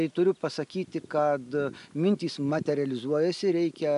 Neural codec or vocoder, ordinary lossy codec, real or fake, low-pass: none; AAC, 96 kbps; real; 10.8 kHz